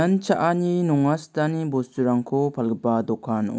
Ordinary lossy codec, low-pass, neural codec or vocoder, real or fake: none; none; none; real